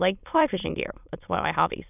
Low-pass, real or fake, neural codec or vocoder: 3.6 kHz; fake; autoencoder, 22.05 kHz, a latent of 192 numbers a frame, VITS, trained on many speakers